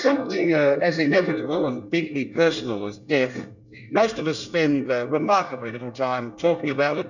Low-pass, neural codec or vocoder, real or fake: 7.2 kHz; codec, 24 kHz, 1 kbps, SNAC; fake